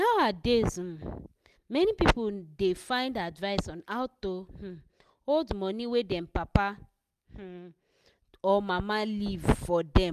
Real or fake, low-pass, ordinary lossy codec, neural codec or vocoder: real; 14.4 kHz; none; none